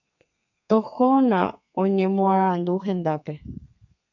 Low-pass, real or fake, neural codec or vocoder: 7.2 kHz; fake; codec, 32 kHz, 1.9 kbps, SNAC